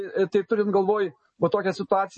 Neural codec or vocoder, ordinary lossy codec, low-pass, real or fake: none; MP3, 32 kbps; 10.8 kHz; real